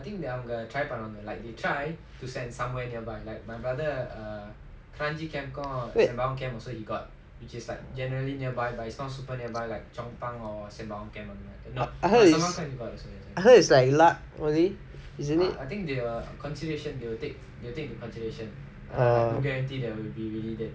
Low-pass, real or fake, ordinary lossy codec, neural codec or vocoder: none; real; none; none